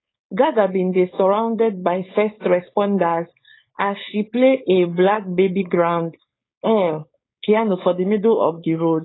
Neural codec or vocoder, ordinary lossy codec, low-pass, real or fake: codec, 16 kHz, 4.8 kbps, FACodec; AAC, 16 kbps; 7.2 kHz; fake